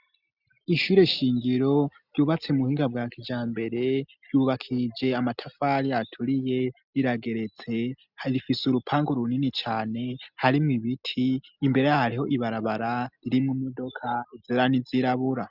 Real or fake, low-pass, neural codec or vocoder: real; 5.4 kHz; none